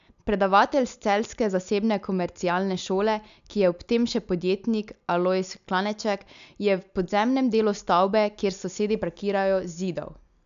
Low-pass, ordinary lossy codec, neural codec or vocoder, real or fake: 7.2 kHz; AAC, 96 kbps; none; real